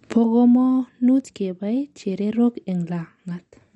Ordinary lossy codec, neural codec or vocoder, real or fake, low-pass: MP3, 48 kbps; none; real; 19.8 kHz